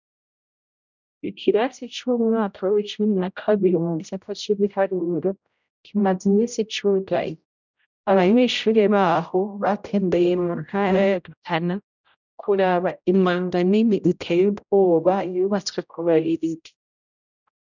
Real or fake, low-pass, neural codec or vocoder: fake; 7.2 kHz; codec, 16 kHz, 0.5 kbps, X-Codec, HuBERT features, trained on general audio